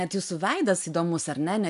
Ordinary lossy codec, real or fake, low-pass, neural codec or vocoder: AAC, 96 kbps; real; 10.8 kHz; none